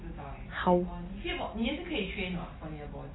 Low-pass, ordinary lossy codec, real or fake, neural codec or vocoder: 7.2 kHz; AAC, 16 kbps; real; none